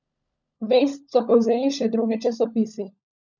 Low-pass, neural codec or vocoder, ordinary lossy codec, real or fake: 7.2 kHz; codec, 16 kHz, 16 kbps, FunCodec, trained on LibriTTS, 50 frames a second; none; fake